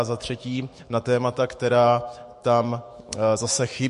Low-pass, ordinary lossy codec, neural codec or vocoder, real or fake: 14.4 kHz; MP3, 48 kbps; autoencoder, 48 kHz, 128 numbers a frame, DAC-VAE, trained on Japanese speech; fake